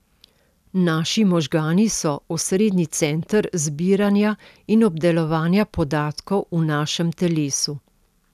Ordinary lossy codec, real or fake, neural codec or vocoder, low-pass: none; real; none; 14.4 kHz